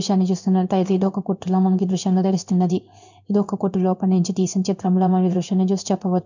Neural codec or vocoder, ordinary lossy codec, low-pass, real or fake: codec, 16 kHz in and 24 kHz out, 1 kbps, XY-Tokenizer; none; 7.2 kHz; fake